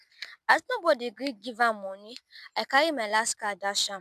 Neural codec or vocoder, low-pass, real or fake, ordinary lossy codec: none; 14.4 kHz; real; none